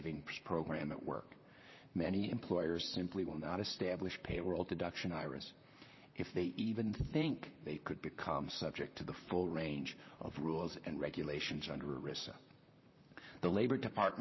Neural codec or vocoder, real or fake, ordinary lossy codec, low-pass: vocoder, 44.1 kHz, 128 mel bands, Pupu-Vocoder; fake; MP3, 24 kbps; 7.2 kHz